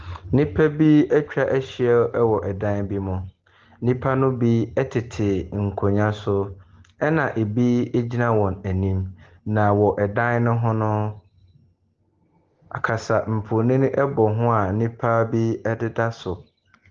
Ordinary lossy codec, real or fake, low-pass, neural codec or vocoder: Opus, 16 kbps; real; 7.2 kHz; none